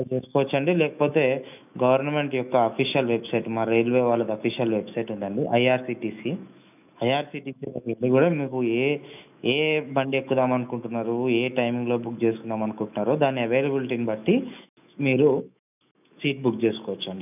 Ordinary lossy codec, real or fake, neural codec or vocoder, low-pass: none; fake; autoencoder, 48 kHz, 128 numbers a frame, DAC-VAE, trained on Japanese speech; 3.6 kHz